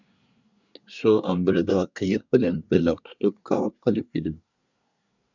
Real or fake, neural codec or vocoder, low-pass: fake; codec, 24 kHz, 1 kbps, SNAC; 7.2 kHz